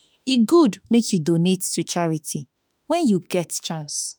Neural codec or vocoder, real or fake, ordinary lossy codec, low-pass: autoencoder, 48 kHz, 32 numbers a frame, DAC-VAE, trained on Japanese speech; fake; none; none